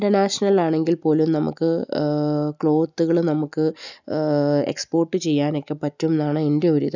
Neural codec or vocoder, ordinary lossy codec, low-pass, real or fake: none; none; 7.2 kHz; real